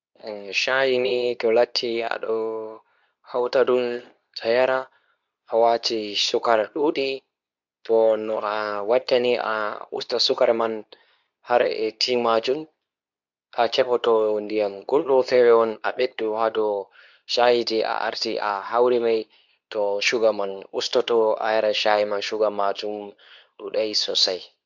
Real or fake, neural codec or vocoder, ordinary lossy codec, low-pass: fake; codec, 24 kHz, 0.9 kbps, WavTokenizer, medium speech release version 2; none; 7.2 kHz